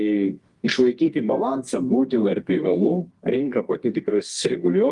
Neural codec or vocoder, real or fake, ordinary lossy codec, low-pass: codec, 24 kHz, 0.9 kbps, WavTokenizer, medium music audio release; fake; Opus, 24 kbps; 10.8 kHz